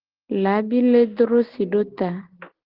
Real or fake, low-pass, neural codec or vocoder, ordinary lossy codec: real; 5.4 kHz; none; Opus, 16 kbps